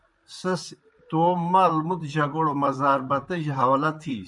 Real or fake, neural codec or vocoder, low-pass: fake; vocoder, 44.1 kHz, 128 mel bands, Pupu-Vocoder; 10.8 kHz